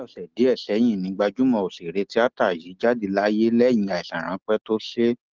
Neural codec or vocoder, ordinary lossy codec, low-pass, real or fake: none; Opus, 16 kbps; 7.2 kHz; real